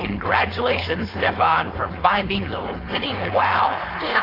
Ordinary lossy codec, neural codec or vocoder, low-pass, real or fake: AAC, 24 kbps; codec, 16 kHz, 4.8 kbps, FACodec; 5.4 kHz; fake